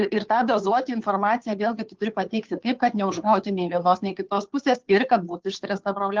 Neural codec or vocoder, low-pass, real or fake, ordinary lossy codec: codec, 16 kHz, 2 kbps, FunCodec, trained on Chinese and English, 25 frames a second; 7.2 kHz; fake; Opus, 24 kbps